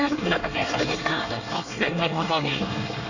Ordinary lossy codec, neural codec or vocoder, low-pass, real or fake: none; codec, 24 kHz, 1 kbps, SNAC; 7.2 kHz; fake